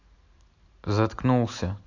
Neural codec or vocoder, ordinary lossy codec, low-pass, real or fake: none; MP3, 48 kbps; 7.2 kHz; real